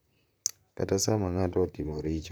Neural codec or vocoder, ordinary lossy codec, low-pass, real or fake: vocoder, 44.1 kHz, 128 mel bands, Pupu-Vocoder; none; none; fake